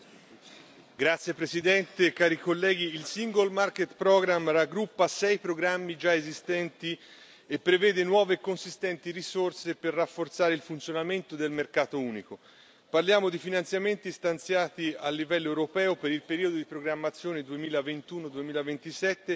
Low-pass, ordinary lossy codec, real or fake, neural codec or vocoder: none; none; real; none